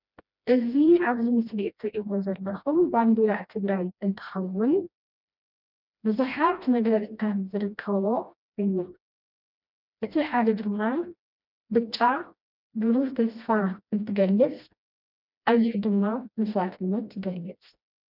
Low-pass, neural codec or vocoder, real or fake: 5.4 kHz; codec, 16 kHz, 1 kbps, FreqCodec, smaller model; fake